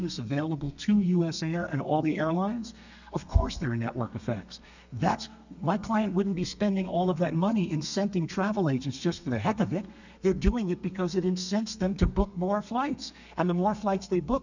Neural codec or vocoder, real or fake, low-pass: codec, 32 kHz, 1.9 kbps, SNAC; fake; 7.2 kHz